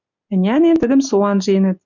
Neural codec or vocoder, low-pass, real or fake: none; 7.2 kHz; real